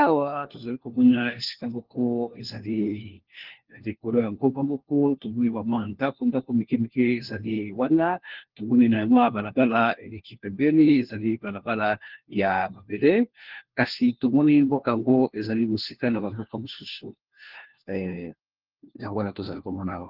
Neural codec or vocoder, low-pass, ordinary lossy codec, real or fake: codec, 16 kHz, 1 kbps, FunCodec, trained on LibriTTS, 50 frames a second; 5.4 kHz; Opus, 16 kbps; fake